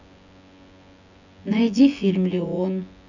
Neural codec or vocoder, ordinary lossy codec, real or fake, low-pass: vocoder, 24 kHz, 100 mel bands, Vocos; none; fake; 7.2 kHz